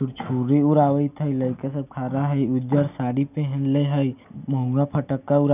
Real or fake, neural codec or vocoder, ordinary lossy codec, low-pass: real; none; none; 3.6 kHz